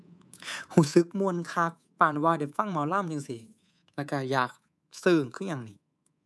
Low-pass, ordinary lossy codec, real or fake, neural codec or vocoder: none; none; fake; codec, 24 kHz, 3.1 kbps, DualCodec